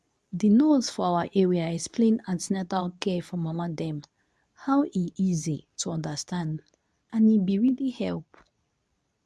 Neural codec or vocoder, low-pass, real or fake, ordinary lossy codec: codec, 24 kHz, 0.9 kbps, WavTokenizer, medium speech release version 2; none; fake; none